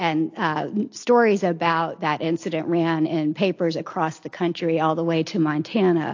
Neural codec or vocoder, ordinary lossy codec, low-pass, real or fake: none; AAC, 48 kbps; 7.2 kHz; real